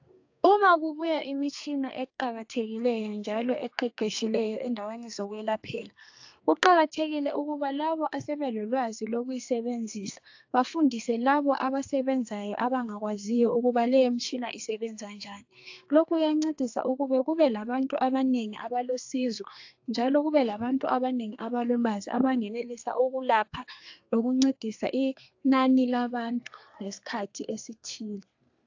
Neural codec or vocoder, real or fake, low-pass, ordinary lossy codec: codec, 32 kHz, 1.9 kbps, SNAC; fake; 7.2 kHz; AAC, 48 kbps